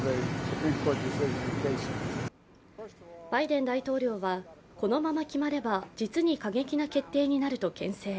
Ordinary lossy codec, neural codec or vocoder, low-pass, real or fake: none; none; none; real